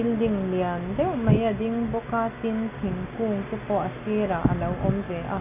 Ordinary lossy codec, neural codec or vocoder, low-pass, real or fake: none; none; 3.6 kHz; real